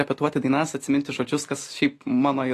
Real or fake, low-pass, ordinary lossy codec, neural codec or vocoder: real; 14.4 kHz; AAC, 48 kbps; none